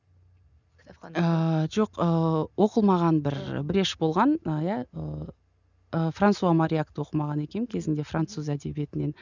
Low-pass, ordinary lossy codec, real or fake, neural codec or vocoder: 7.2 kHz; none; real; none